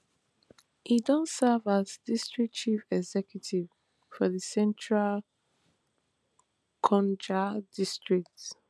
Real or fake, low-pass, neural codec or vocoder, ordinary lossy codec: real; none; none; none